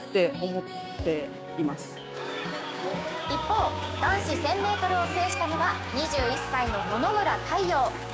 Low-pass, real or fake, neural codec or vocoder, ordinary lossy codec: none; fake; codec, 16 kHz, 6 kbps, DAC; none